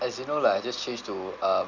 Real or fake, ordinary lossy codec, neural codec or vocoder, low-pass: real; none; none; 7.2 kHz